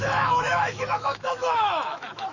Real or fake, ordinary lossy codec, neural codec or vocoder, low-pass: fake; none; autoencoder, 48 kHz, 128 numbers a frame, DAC-VAE, trained on Japanese speech; 7.2 kHz